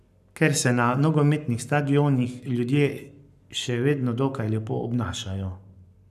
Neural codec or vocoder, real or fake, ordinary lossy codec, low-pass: codec, 44.1 kHz, 7.8 kbps, Pupu-Codec; fake; none; 14.4 kHz